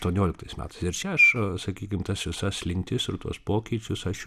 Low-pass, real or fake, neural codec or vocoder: 14.4 kHz; real; none